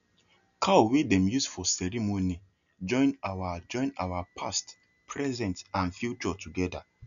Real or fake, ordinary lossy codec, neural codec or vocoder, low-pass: real; none; none; 7.2 kHz